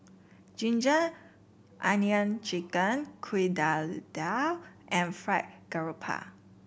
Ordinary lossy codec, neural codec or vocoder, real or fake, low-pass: none; none; real; none